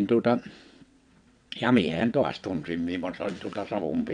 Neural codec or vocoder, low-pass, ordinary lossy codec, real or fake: vocoder, 22.05 kHz, 80 mel bands, WaveNeXt; 9.9 kHz; none; fake